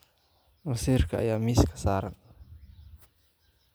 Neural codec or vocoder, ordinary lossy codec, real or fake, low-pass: none; none; real; none